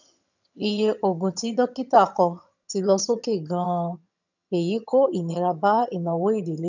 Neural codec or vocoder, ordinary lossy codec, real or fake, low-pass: vocoder, 22.05 kHz, 80 mel bands, HiFi-GAN; none; fake; 7.2 kHz